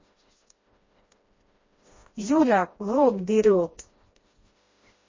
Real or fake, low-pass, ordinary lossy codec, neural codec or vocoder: fake; 7.2 kHz; MP3, 32 kbps; codec, 16 kHz, 1 kbps, FreqCodec, smaller model